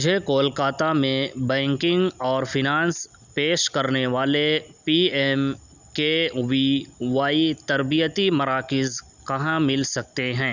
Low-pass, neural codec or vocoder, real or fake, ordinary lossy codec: 7.2 kHz; none; real; none